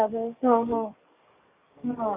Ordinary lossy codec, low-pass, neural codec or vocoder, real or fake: Opus, 64 kbps; 3.6 kHz; codec, 44.1 kHz, 7.8 kbps, DAC; fake